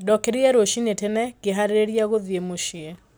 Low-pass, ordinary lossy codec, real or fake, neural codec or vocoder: none; none; real; none